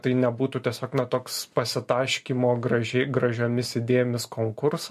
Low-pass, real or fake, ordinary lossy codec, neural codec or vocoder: 14.4 kHz; real; MP3, 64 kbps; none